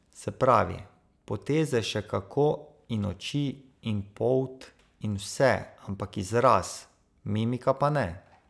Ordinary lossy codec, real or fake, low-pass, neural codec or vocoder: none; real; none; none